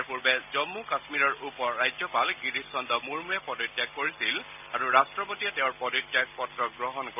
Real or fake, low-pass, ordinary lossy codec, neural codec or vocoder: real; 3.6 kHz; none; none